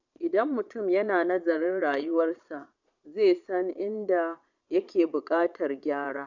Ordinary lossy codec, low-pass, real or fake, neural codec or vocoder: none; 7.2 kHz; fake; vocoder, 22.05 kHz, 80 mel bands, Vocos